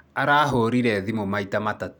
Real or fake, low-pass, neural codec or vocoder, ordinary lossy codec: real; 19.8 kHz; none; none